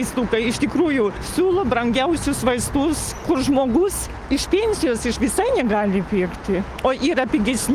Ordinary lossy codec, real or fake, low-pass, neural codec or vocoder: Opus, 32 kbps; real; 14.4 kHz; none